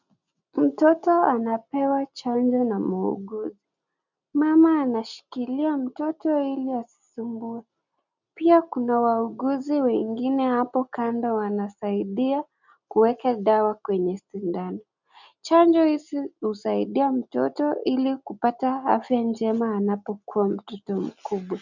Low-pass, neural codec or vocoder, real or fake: 7.2 kHz; none; real